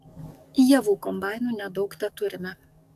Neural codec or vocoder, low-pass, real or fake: codec, 44.1 kHz, 7.8 kbps, DAC; 14.4 kHz; fake